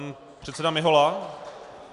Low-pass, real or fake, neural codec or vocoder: 10.8 kHz; real; none